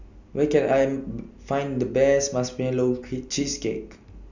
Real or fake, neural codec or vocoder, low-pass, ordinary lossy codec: real; none; 7.2 kHz; none